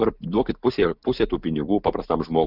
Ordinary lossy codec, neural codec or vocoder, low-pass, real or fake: Opus, 64 kbps; none; 5.4 kHz; real